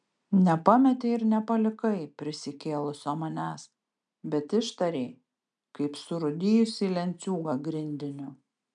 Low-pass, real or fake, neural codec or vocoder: 9.9 kHz; real; none